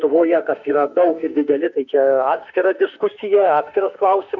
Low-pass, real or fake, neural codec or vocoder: 7.2 kHz; fake; autoencoder, 48 kHz, 32 numbers a frame, DAC-VAE, trained on Japanese speech